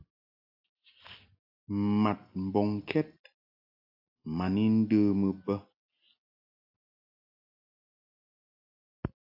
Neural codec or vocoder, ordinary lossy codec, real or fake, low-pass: none; AAC, 48 kbps; real; 5.4 kHz